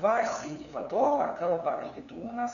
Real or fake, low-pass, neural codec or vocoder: fake; 7.2 kHz; codec, 16 kHz, 2 kbps, FunCodec, trained on LibriTTS, 25 frames a second